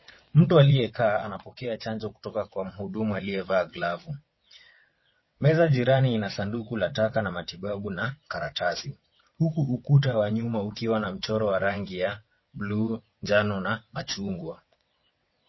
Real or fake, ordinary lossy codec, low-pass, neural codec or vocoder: fake; MP3, 24 kbps; 7.2 kHz; vocoder, 22.05 kHz, 80 mel bands, WaveNeXt